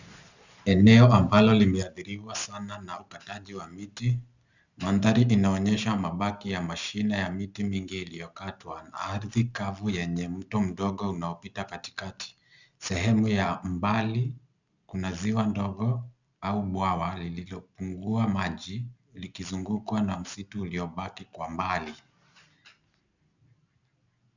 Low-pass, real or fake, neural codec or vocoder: 7.2 kHz; real; none